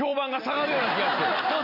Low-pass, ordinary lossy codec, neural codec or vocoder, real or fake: 5.4 kHz; none; none; real